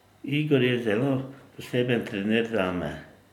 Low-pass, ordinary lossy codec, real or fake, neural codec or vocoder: 19.8 kHz; none; real; none